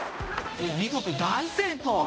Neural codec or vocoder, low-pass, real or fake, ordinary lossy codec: codec, 16 kHz, 0.5 kbps, X-Codec, HuBERT features, trained on balanced general audio; none; fake; none